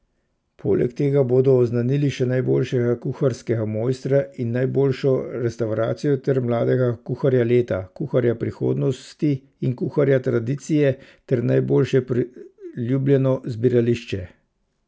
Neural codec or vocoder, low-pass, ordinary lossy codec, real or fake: none; none; none; real